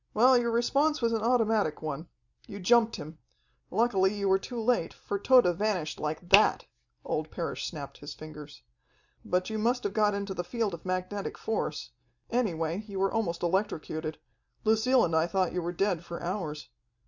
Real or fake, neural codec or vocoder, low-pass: real; none; 7.2 kHz